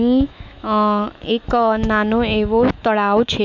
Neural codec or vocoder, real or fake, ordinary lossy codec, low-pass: autoencoder, 48 kHz, 128 numbers a frame, DAC-VAE, trained on Japanese speech; fake; none; 7.2 kHz